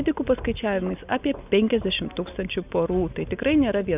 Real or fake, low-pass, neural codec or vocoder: real; 3.6 kHz; none